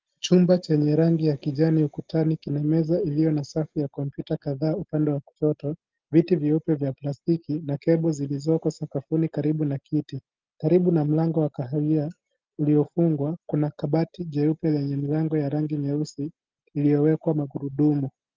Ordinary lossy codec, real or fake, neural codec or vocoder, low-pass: Opus, 32 kbps; real; none; 7.2 kHz